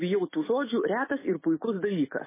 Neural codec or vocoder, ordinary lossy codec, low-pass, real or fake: none; MP3, 16 kbps; 3.6 kHz; real